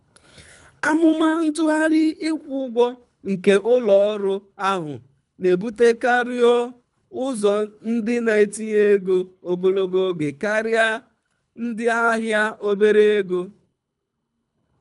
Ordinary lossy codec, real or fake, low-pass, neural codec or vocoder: none; fake; 10.8 kHz; codec, 24 kHz, 3 kbps, HILCodec